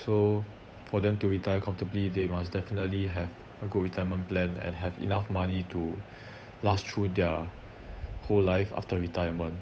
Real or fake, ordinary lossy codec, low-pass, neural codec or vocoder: fake; none; none; codec, 16 kHz, 8 kbps, FunCodec, trained on Chinese and English, 25 frames a second